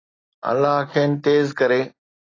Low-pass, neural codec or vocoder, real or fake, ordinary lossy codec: 7.2 kHz; none; real; AAC, 32 kbps